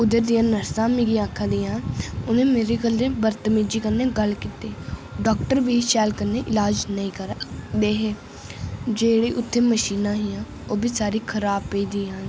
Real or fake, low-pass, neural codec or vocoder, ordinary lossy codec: real; none; none; none